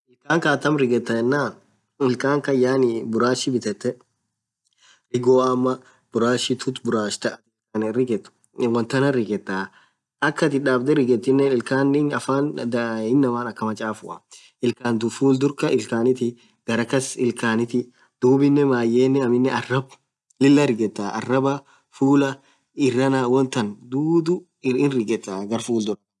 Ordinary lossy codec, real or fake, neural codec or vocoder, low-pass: none; real; none; none